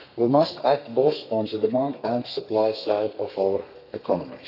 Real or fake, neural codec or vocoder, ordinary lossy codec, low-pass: fake; codec, 32 kHz, 1.9 kbps, SNAC; none; 5.4 kHz